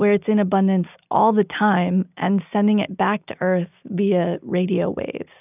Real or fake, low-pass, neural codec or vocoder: real; 3.6 kHz; none